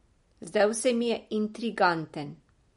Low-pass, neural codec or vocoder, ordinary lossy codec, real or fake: 10.8 kHz; none; MP3, 48 kbps; real